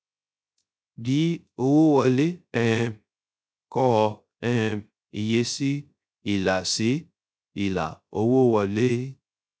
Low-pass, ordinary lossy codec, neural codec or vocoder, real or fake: none; none; codec, 16 kHz, 0.3 kbps, FocalCodec; fake